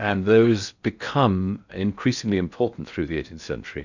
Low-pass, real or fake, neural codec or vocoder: 7.2 kHz; fake; codec, 16 kHz in and 24 kHz out, 0.6 kbps, FocalCodec, streaming, 2048 codes